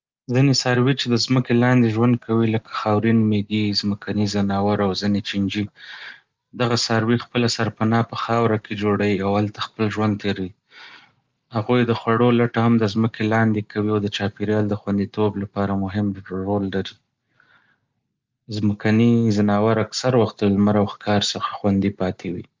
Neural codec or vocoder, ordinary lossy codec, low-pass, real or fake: none; Opus, 24 kbps; 7.2 kHz; real